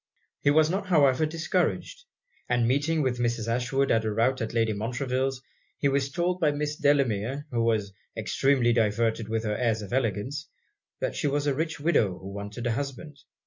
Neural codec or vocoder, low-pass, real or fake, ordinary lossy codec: none; 7.2 kHz; real; MP3, 48 kbps